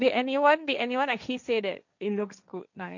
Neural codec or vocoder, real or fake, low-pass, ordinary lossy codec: codec, 16 kHz, 1.1 kbps, Voila-Tokenizer; fake; 7.2 kHz; none